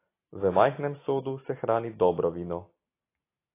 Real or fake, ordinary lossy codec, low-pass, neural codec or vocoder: real; AAC, 24 kbps; 3.6 kHz; none